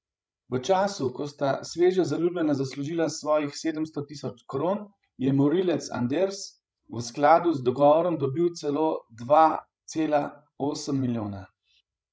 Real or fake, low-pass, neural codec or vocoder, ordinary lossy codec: fake; none; codec, 16 kHz, 16 kbps, FreqCodec, larger model; none